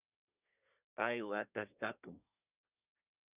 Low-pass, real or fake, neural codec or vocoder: 3.6 kHz; fake; codec, 24 kHz, 1 kbps, SNAC